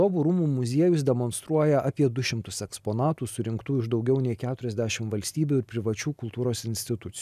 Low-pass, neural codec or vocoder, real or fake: 14.4 kHz; none; real